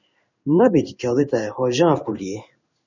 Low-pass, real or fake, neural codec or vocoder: 7.2 kHz; fake; codec, 16 kHz in and 24 kHz out, 1 kbps, XY-Tokenizer